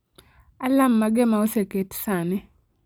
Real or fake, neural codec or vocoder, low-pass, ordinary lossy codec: real; none; none; none